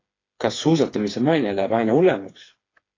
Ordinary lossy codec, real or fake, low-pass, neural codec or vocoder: AAC, 32 kbps; fake; 7.2 kHz; codec, 16 kHz, 4 kbps, FreqCodec, smaller model